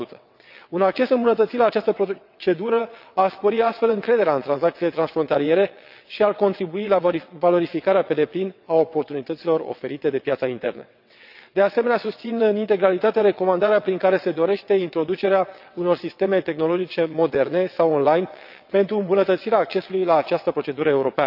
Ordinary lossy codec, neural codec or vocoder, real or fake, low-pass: none; vocoder, 22.05 kHz, 80 mel bands, WaveNeXt; fake; 5.4 kHz